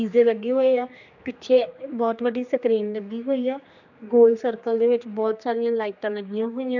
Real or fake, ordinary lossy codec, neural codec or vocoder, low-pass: fake; none; codec, 16 kHz, 2 kbps, X-Codec, HuBERT features, trained on general audio; 7.2 kHz